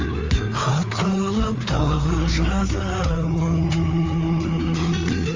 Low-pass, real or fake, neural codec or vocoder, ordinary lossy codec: 7.2 kHz; fake; codec, 16 kHz, 4 kbps, FreqCodec, larger model; Opus, 32 kbps